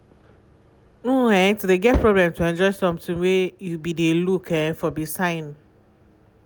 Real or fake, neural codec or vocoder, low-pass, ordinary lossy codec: real; none; none; none